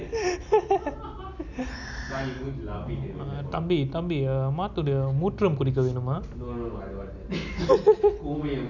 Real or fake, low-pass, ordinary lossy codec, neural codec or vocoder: real; 7.2 kHz; none; none